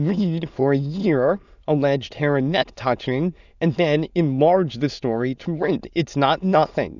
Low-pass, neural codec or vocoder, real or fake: 7.2 kHz; autoencoder, 22.05 kHz, a latent of 192 numbers a frame, VITS, trained on many speakers; fake